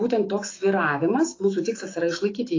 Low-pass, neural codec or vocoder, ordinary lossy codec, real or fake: 7.2 kHz; autoencoder, 48 kHz, 128 numbers a frame, DAC-VAE, trained on Japanese speech; AAC, 32 kbps; fake